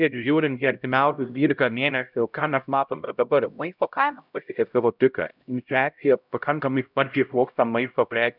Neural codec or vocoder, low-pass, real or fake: codec, 16 kHz, 0.5 kbps, X-Codec, HuBERT features, trained on LibriSpeech; 5.4 kHz; fake